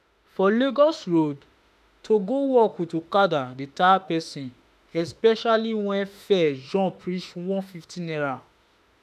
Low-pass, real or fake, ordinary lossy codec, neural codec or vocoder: 14.4 kHz; fake; none; autoencoder, 48 kHz, 32 numbers a frame, DAC-VAE, trained on Japanese speech